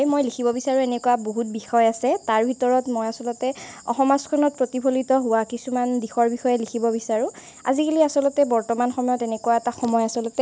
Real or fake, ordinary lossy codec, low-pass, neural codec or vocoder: real; none; none; none